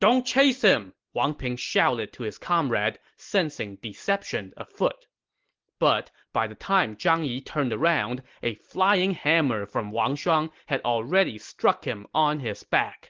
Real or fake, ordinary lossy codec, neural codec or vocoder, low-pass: real; Opus, 16 kbps; none; 7.2 kHz